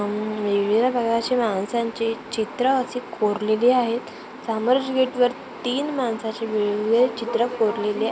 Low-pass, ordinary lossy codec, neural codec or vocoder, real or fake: none; none; none; real